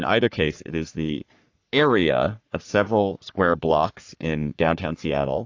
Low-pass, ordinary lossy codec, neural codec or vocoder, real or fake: 7.2 kHz; AAC, 48 kbps; codec, 44.1 kHz, 3.4 kbps, Pupu-Codec; fake